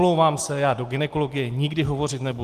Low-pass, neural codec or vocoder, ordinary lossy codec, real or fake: 14.4 kHz; none; Opus, 24 kbps; real